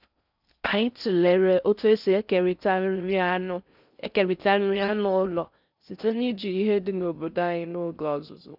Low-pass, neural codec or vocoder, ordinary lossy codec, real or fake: 5.4 kHz; codec, 16 kHz in and 24 kHz out, 0.6 kbps, FocalCodec, streaming, 4096 codes; none; fake